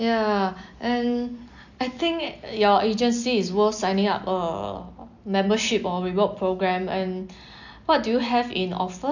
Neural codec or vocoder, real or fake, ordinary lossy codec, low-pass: none; real; none; 7.2 kHz